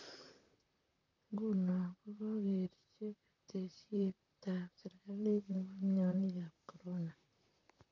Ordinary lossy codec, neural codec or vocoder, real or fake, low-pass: none; vocoder, 44.1 kHz, 128 mel bands, Pupu-Vocoder; fake; 7.2 kHz